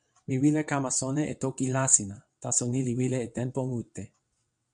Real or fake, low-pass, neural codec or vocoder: fake; 9.9 kHz; vocoder, 22.05 kHz, 80 mel bands, WaveNeXt